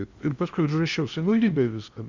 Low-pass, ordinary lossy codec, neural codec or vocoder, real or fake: 7.2 kHz; Opus, 64 kbps; codec, 16 kHz in and 24 kHz out, 0.8 kbps, FocalCodec, streaming, 65536 codes; fake